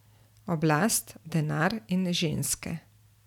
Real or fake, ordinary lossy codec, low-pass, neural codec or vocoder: real; none; 19.8 kHz; none